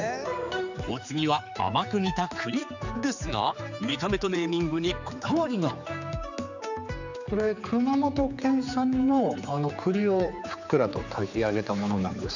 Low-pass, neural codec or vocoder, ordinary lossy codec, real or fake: 7.2 kHz; codec, 16 kHz, 4 kbps, X-Codec, HuBERT features, trained on general audio; none; fake